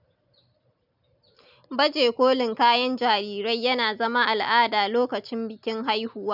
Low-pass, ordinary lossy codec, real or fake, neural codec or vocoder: 5.4 kHz; none; real; none